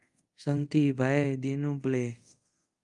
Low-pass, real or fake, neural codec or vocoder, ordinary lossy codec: 10.8 kHz; fake; codec, 24 kHz, 0.5 kbps, DualCodec; Opus, 32 kbps